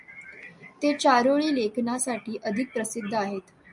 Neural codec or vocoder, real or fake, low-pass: none; real; 10.8 kHz